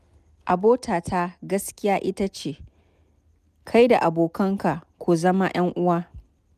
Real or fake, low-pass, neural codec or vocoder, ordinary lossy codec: real; 14.4 kHz; none; none